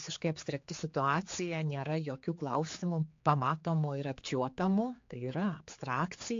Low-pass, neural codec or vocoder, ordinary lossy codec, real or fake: 7.2 kHz; codec, 16 kHz, 4 kbps, X-Codec, HuBERT features, trained on general audio; AAC, 48 kbps; fake